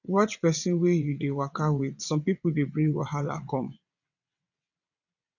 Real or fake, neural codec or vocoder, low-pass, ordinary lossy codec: fake; vocoder, 22.05 kHz, 80 mel bands, WaveNeXt; 7.2 kHz; none